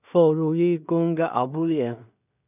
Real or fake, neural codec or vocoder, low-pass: fake; codec, 16 kHz in and 24 kHz out, 0.4 kbps, LongCat-Audio-Codec, two codebook decoder; 3.6 kHz